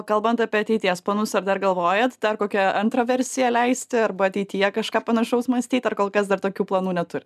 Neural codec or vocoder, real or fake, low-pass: none; real; 14.4 kHz